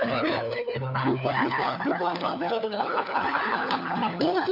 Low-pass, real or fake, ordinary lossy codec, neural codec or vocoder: 5.4 kHz; fake; none; codec, 16 kHz, 4 kbps, FunCodec, trained on LibriTTS, 50 frames a second